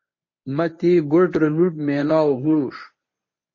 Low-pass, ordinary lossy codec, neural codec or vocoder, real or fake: 7.2 kHz; MP3, 32 kbps; codec, 24 kHz, 0.9 kbps, WavTokenizer, medium speech release version 1; fake